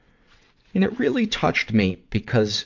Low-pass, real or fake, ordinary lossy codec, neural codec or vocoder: 7.2 kHz; fake; MP3, 64 kbps; vocoder, 22.05 kHz, 80 mel bands, WaveNeXt